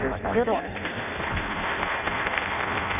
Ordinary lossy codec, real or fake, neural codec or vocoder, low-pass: none; fake; codec, 16 kHz in and 24 kHz out, 0.6 kbps, FireRedTTS-2 codec; 3.6 kHz